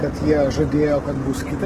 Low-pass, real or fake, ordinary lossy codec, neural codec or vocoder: 14.4 kHz; real; Opus, 16 kbps; none